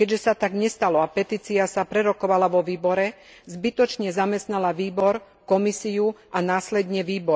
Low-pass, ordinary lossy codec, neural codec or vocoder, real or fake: none; none; none; real